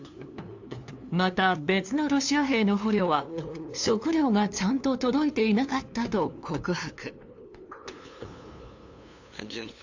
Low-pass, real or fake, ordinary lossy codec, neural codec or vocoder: 7.2 kHz; fake; AAC, 48 kbps; codec, 16 kHz, 2 kbps, FunCodec, trained on LibriTTS, 25 frames a second